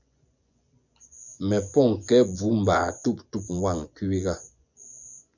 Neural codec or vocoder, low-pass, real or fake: vocoder, 24 kHz, 100 mel bands, Vocos; 7.2 kHz; fake